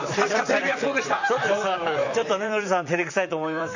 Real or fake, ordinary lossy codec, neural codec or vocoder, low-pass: fake; none; vocoder, 44.1 kHz, 128 mel bands, Pupu-Vocoder; 7.2 kHz